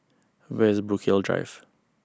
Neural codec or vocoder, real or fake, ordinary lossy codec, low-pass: none; real; none; none